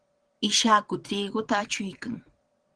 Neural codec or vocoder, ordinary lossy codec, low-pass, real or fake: vocoder, 22.05 kHz, 80 mel bands, Vocos; Opus, 16 kbps; 9.9 kHz; fake